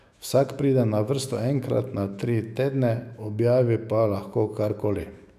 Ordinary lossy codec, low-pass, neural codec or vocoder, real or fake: none; 14.4 kHz; autoencoder, 48 kHz, 128 numbers a frame, DAC-VAE, trained on Japanese speech; fake